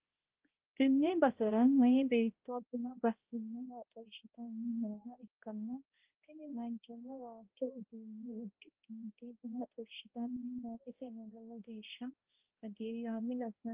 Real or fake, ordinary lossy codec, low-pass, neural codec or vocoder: fake; Opus, 32 kbps; 3.6 kHz; codec, 16 kHz, 0.5 kbps, X-Codec, HuBERT features, trained on balanced general audio